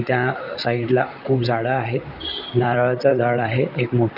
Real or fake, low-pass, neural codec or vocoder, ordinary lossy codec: fake; 5.4 kHz; vocoder, 44.1 kHz, 128 mel bands, Pupu-Vocoder; none